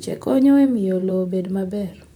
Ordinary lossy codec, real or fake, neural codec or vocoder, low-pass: none; fake; vocoder, 44.1 kHz, 128 mel bands, Pupu-Vocoder; 19.8 kHz